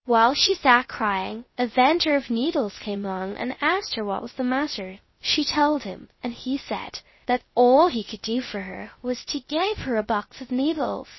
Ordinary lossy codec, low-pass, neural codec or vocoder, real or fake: MP3, 24 kbps; 7.2 kHz; codec, 16 kHz, 0.3 kbps, FocalCodec; fake